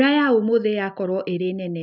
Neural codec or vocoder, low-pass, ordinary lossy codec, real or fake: none; 5.4 kHz; none; real